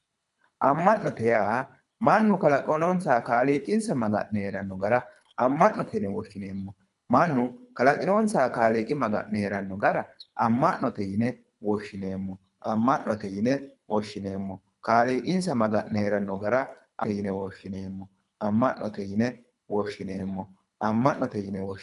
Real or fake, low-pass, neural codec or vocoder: fake; 10.8 kHz; codec, 24 kHz, 3 kbps, HILCodec